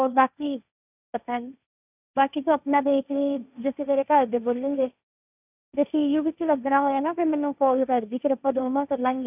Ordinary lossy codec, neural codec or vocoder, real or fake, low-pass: none; codec, 16 kHz, 1.1 kbps, Voila-Tokenizer; fake; 3.6 kHz